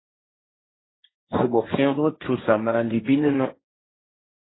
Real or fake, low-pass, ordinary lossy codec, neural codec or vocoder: fake; 7.2 kHz; AAC, 16 kbps; codec, 16 kHz, 1.1 kbps, Voila-Tokenizer